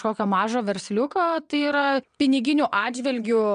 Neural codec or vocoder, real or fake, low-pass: vocoder, 22.05 kHz, 80 mel bands, WaveNeXt; fake; 9.9 kHz